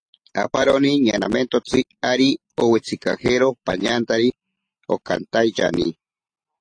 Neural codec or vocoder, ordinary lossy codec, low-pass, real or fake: none; AAC, 48 kbps; 9.9 kHz; real